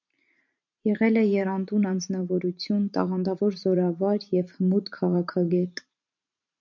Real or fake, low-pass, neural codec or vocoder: fake; 7.2 kHz; vocoder, 44.1 kHz, 128 mel bands every 512 samples, BigVGAN v2